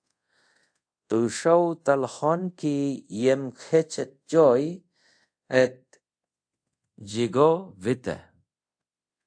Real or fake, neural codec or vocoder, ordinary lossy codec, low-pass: fake; codec, 24 kHz, 0.5 kbps, DualCodec; AAC, 64 kbps; 9.9 kHz